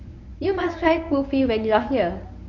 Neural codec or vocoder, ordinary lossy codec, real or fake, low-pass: codec, 24 kHz, 0.9 kbps, WavTokenizer, medium speech release version 1; none; fake; 7.2 kHz